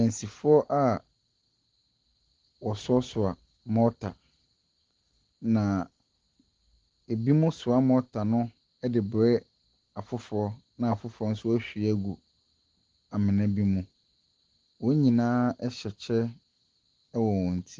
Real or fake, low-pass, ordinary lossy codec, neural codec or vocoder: real; 7.2 kHz; Opus, 16 kbps; none